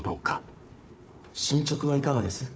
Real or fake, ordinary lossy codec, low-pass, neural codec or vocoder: fake; none; none; codec, 16 kHz, 4 kbps, FunCodec, trained on Chinese and English, 50 frames a second